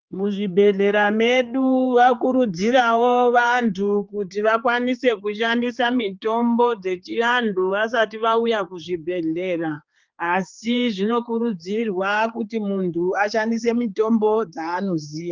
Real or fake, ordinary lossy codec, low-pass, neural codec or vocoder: fake; Opus, 32 kbps; 7.2 kHz; codec, 16 kHz, 4 kbps, X-Codec, HuBERT features, trained on general audio